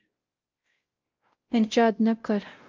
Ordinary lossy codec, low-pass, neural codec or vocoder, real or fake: Opus, 32 kbps; 7.2 kHz; codec, 16 kHz, 0.5 kbps, X-Codec, WavLM features, trained on Multilingual LibriSpeech; fake